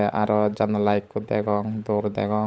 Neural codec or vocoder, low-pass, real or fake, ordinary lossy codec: codec, 16 kHz, 16 kbps, FunCodec, trained on LibriTTS, 50 frames a second; none; fake; none